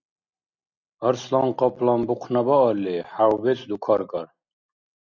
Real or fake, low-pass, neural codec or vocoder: real; 7.2 kHz; none